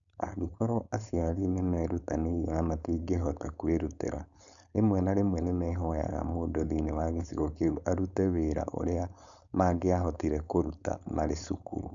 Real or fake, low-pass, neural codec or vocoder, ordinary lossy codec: fake; 7.2 kHz; codec, 16 kHz, 4.8 kbps, FACodec; none